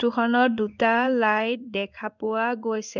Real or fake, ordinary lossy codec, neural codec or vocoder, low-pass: fake; none; codec, 16 kHz in and 24 kHz out, 1 kbps, XY-Tokenizer; 7.2 kHz